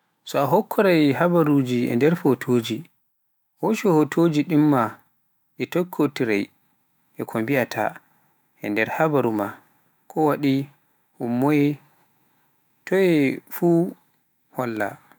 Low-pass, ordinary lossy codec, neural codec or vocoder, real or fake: none; none; autoencoder, 48 kHz, 128 numbers a frame, DAC-VAE, trained on Japanese speech; fake